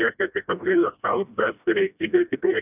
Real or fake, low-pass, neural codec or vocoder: fake; 3.6 kHz; codec, 16 kHz, 1 kbps, FreqCodec, smaller model